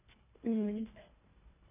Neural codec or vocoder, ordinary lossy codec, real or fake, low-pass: codec, 24 kHz, 1.5 kbps, HILCodec; none; fake; 3.6 kHz